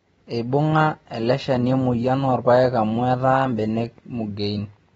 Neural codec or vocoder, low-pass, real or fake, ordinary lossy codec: none; 19.8 kHz; real; AAC, 24 kbps